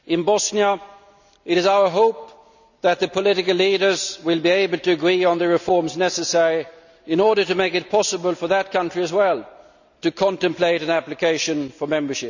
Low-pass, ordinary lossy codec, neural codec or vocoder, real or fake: 7.2 kHz; none; none; real